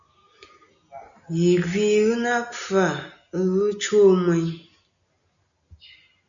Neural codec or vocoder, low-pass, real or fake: none; 7.2 kHz; real